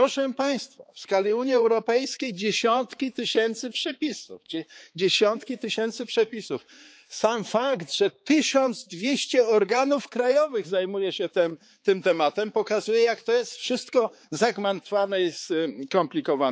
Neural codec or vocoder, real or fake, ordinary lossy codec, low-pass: codec, 16 kHz, 4 kbps, X-Codec, HuBERT features, trained on balanced general audio; fake; none; none